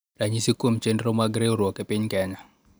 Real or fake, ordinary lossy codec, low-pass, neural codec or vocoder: real; none; none; none